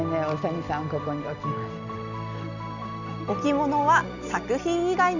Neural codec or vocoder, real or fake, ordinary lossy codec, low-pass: none; real; none; 7.2 kHz